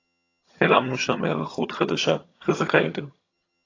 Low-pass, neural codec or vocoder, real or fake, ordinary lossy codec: 7.2 kHz; vocoder, 22.05 kHz, 80 mel bands, HiFi-GAN; fake; AAC, 32 kbps